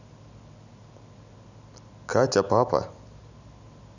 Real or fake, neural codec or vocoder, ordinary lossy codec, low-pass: real; none; none; 7.2 kHz